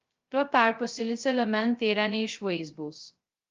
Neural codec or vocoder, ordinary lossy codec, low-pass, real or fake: codec, 16 kHz, 0.2 kbps, FocalCodec; Opus, 32 kbps; 7.2 kHz; fake